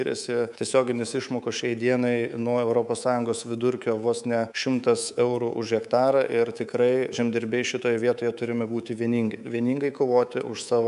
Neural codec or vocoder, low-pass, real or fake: codec, 24 kHz, 3.1 kbps, DualCodec; 10.8 kHz; fake